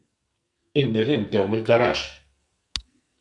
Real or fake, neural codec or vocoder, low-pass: fake; codec, 44.1 kHz, 2.6 kbps, SNAC; 10.8 kHz